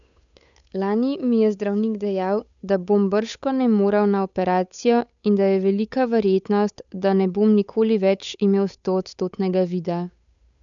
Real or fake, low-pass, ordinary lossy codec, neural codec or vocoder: fake; 7.2 kHz; none; codec, 16 kHz, 8 kbps, FunCodec, trained on Chinese and English, 25 frames a second